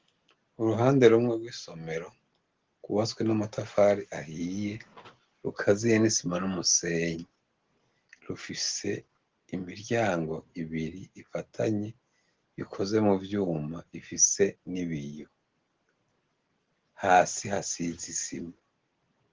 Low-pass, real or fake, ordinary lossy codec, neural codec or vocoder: 7.2 kHz; real; Opus, 16 kbps; none